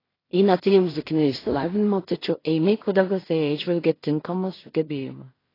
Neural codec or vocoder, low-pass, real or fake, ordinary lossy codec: codec, 16 kHz in and 24 kHz out, 0.4 kbps, LongCat-Audio-Codec, two codebook decoder; 5.4 kHz; fake; AAC, 24 kbps